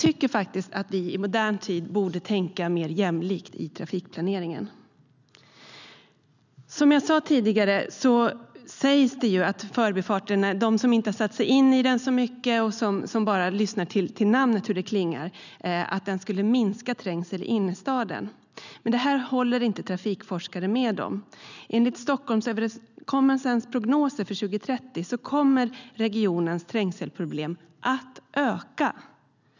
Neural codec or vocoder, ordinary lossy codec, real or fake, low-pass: none; none; real; 7.2 kHz